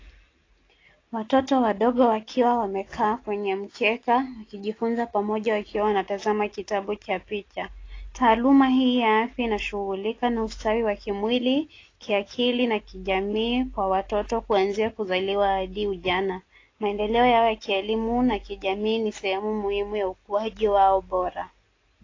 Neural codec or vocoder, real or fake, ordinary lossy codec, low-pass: none; real; AAC, 32 kbps; 7.2 kHz